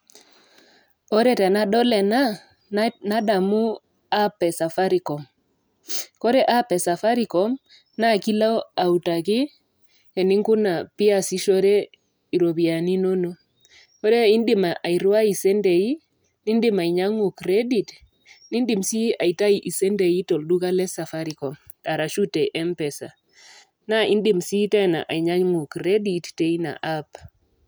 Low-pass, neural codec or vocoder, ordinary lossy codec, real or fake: none; none; none; real